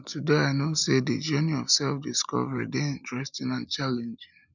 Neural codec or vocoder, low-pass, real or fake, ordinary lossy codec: none; 7.2 kHz; real; none